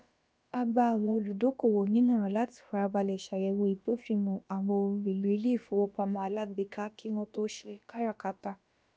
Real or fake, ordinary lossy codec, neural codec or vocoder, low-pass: fake; none; codec, 16 kHz, about 1 kbps, DyCAST, with the encoder's durations; none